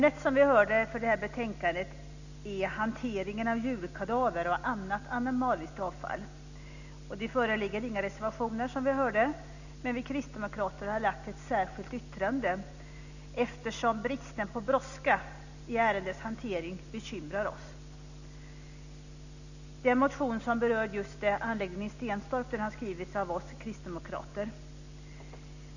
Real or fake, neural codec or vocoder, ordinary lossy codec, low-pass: real; none; none; 7.2 kHz